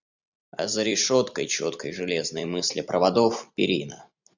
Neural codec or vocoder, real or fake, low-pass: vocoder, 44.1 kHz, 128 mel bands every 256 samples, BigVGAN v2; fake; 7.2 kHz